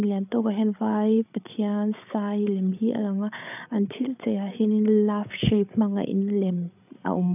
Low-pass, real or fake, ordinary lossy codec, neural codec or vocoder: 3.6 kHz; fake; none; codec, 16 kHz, 8 kbps, FreqCodec, larger model